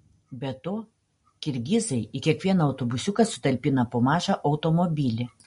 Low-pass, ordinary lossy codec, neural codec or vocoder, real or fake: 10.8 kHz; MP3, 48 kbps; none; real